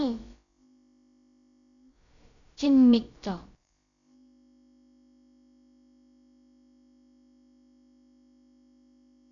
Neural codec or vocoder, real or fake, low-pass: codec, 16 kHz, about 1 kbps, DyCAST, with the encoder's durations; fake; 7.2 kHz